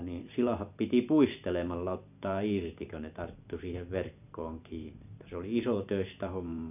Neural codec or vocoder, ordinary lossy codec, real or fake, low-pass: vocoder, 44.1 kHz, 128 mel bands every 512 samples, BigVGAN v2; none; fake; 3.6 kHz